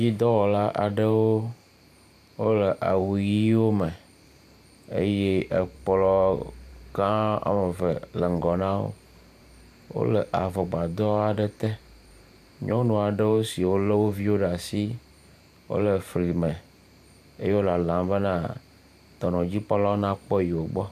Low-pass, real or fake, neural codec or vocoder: 14.4 kHz; fake; vocoder, 44.1 kHz, 128 mel bands every 512 samples, BigVGAN v2